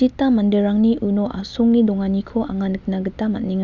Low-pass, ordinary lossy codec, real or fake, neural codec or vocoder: 7.2 kHz; none; real; none